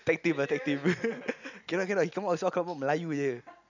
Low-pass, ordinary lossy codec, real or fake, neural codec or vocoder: 7.2 kHz; none; real; none